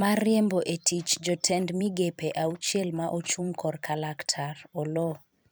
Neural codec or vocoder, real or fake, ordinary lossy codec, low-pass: vocoder, 44.1 kHz, 128 mel bands every 256 samples, BigVGAN v2; fake; none; none